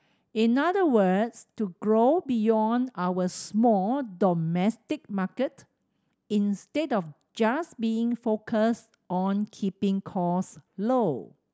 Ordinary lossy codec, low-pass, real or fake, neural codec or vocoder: none; none; real; none